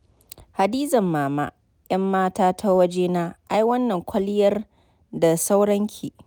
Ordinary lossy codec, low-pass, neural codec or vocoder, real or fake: none; none; none; real